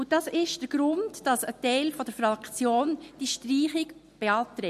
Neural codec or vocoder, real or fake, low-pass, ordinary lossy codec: none; real; 14.4 kHz; MP3, 64 kbps